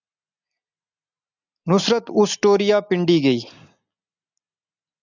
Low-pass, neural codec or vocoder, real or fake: 7.2 kHz; none; real